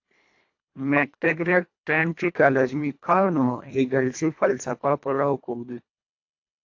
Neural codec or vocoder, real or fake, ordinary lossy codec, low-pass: codec, 24 kHz, 1.5 kbps, HILCodec; fake; AAC, 48 kbps; 7.2 kHz